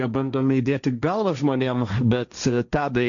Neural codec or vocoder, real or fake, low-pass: codec, 16 kHz, 1.1 kbps, Voila-Tokenizer; fake; 7.2 kHz